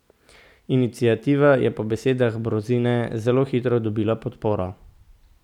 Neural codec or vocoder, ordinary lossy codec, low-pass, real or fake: none; none; 19.8 kHz; real